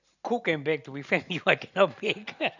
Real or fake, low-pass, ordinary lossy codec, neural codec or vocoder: real; 7.2 kHz; none; none